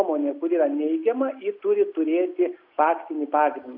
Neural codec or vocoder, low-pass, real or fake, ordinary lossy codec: none; 5.4 kHz; real; AAC, 48 kbps